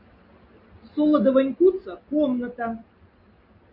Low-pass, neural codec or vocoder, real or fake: 5.4 kHz; none; real